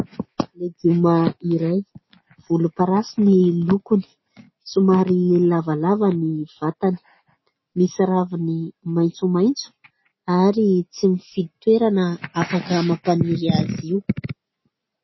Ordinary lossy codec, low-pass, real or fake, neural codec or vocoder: MP3, 24 kbps; 7.2 kHz; real; none